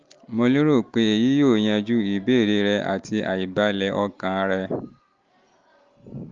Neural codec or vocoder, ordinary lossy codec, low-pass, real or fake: none; Opus, 32 kbps; 7.2 kHz; real